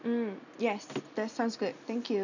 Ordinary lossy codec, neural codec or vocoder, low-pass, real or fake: none; none; 7.2 kHz; real